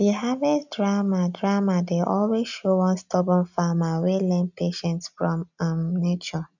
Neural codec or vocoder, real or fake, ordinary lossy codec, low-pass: none; real; none; 7.2 kHz